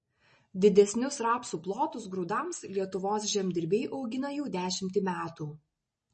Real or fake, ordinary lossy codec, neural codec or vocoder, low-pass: real; MP3, 32 kbps; none; 10.8 kHz